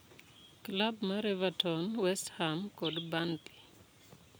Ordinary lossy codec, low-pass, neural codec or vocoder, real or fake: none; none; none; real